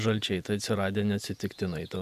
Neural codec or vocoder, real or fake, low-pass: vocoder, 48 kHz, 128 mel bands, Vocos; fake; 14.4 kHz